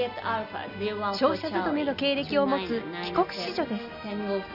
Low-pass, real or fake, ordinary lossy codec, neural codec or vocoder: 5.4 kHz; real; none; none